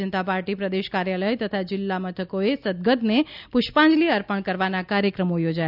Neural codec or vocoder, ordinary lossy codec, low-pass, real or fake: none; none; 5.4 kHz; real